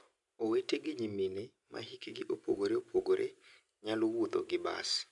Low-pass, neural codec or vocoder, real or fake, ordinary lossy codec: 10.8 kHz; none; real; none